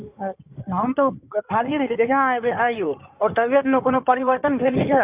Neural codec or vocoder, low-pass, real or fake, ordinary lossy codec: codec, 16 kHz in and 24 kHz out, 2.2 kbps, FireRedTTS-2 codec; 3.6 kHz; fake; Opus, 64 kbps